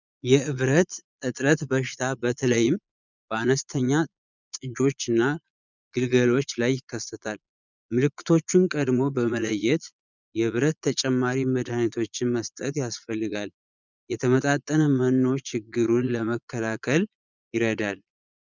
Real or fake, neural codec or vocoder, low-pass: fake; vocoder, 22.05 kHz, 80 mel bands, Vocos; 7.2 kHz